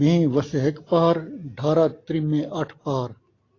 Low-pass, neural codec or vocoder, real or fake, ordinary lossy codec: 7.2 kHz; none; real; AAC, 32 kbps